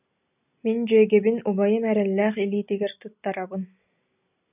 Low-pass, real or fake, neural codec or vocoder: 3.6 kHz; real; none